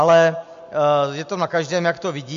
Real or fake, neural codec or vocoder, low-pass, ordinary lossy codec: real; none; 7.2 kHz; MP3, 48 kbps